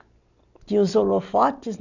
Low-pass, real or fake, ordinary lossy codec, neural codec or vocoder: 7.2 kHz; real; none; none